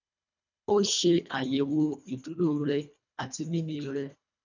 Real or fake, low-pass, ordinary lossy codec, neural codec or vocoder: fake; 7.2 kHz; none; codec, 24 kHz, 1.5 kbps, HILCodec